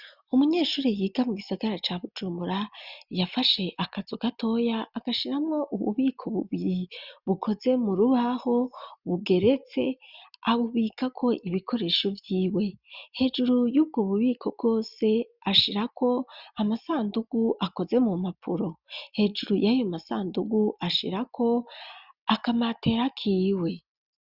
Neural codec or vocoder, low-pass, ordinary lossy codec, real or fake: none; 5.4 kHz; Opus, 64 kbps; real